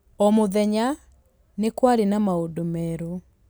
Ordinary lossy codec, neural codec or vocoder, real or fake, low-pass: none; none; real; none